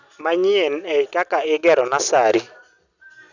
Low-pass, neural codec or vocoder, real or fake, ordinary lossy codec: 7.2 kHz; none; real; none